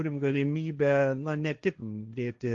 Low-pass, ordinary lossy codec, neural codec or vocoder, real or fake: 7.2 kHz; Opus, 32 kbps; codec, 16 kHz, 1.1 kbps, Voila-Tokenizer; fake